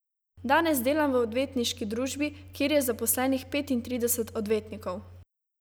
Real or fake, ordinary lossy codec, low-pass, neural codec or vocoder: real; none; none; none